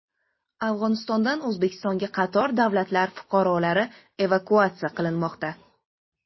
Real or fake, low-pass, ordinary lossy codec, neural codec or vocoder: real; 7.2 kHz; MP3, 24 kbps; none